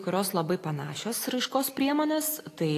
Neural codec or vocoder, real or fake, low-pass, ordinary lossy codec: none; real; 14.4 kHz; AAC, 48 kbps